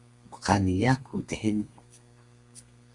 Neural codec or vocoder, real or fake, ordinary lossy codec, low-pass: codec, 32 kHz, 1.9 kbps, SNAC; fake; Opus, 64 kbps; 10.8 kHz